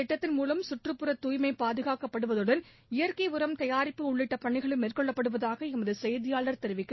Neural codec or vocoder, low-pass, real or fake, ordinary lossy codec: none; 7.2 kHz; real; MP3, 24 kbps